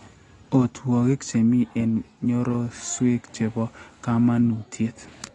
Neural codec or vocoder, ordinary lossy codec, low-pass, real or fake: none; AAC, 32 kbps; 10.8 kHz; real